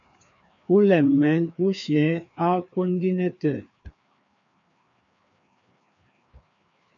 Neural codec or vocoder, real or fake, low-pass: codec, 16 kHz, 2 kbps, FreqCodec, larger model; fake; 7.2 kHz